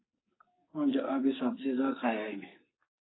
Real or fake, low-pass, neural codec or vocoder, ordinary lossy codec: fake; 7.2 kHz; codec, 44.1 kHz, 2.6 kbps, SNAC; AAC, 16 kbps